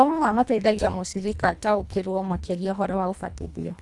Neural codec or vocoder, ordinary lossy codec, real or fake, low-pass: codec, 24 kHz, 1.5 kbps, HILCodec; none; fake; none